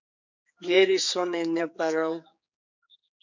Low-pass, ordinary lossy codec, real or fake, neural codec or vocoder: 7.2 kHz; MP3, 48 kbps; fake; codec, 16 kHz, 4 kbps, X-Codec, HuBERT features, trained on general audio